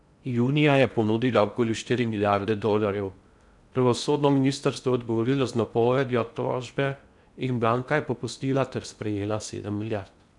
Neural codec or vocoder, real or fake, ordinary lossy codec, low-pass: codec, 16 kHz in and 24 kHz out, 0.6 kbps, FocalCodec, streaming, 4096 codes; fake; none; 10.8 kHz